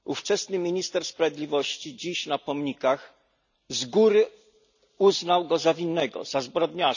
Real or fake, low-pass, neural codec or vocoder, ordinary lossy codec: real; 7.2 kHz; none; none